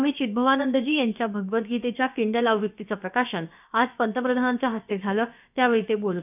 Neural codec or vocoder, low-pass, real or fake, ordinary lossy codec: codec, 16 kHz, about 1 kbps, DyCAST, with the encoder's durations; 3.6 kHz; fake; none